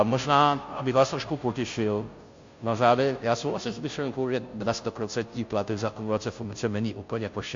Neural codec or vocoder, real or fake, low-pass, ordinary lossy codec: codec, 16 kHz, 0.5 kbps, FunCodec, trained on Chinese and English, 25 frames a second; fake; 7.2 kHz; MP3, 48 kbps